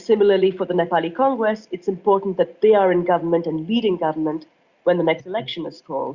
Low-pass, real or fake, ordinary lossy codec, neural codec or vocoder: 7.2 kHz; real; Opus, 64 kbps; none